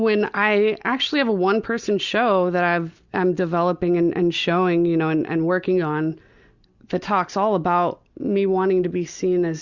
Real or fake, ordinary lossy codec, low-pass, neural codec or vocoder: real; Opus, 64 kbps; 7.2 kHz; none